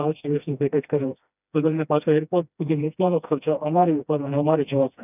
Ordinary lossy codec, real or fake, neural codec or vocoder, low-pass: none; fake; codec, 16 kHz, 1 kbps, FreqCodec, smaller model; 3.6 kHz